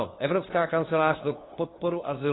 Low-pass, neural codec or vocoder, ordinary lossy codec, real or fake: 7.2 kHz; codec, 16 kHz, 2 kbps, FunCodec, trained on LibriTTS, 25 frames a second; AAC, 16 kbps; fake